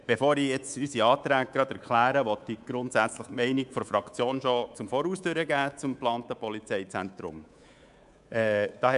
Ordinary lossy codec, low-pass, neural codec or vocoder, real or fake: none; 10.8 kHz; codec, 24 kHz, 3.1 kbps, DualCodec; fake